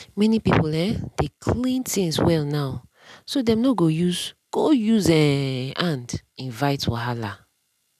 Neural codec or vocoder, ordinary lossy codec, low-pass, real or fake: none; none; 14.4 kHz; real